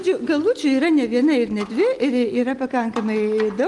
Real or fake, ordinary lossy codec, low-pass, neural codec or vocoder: real; Opus, 24 kbps; 10.8 kHz; none